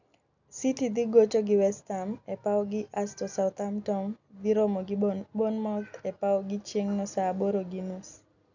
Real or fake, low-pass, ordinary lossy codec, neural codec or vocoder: real; 7.2 kHz; none; none